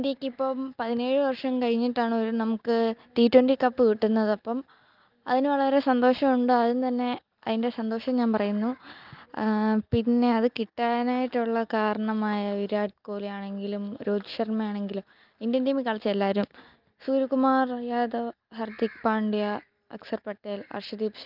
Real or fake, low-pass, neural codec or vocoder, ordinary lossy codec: real; 5.4 kHz; none; Opus, 24 kbps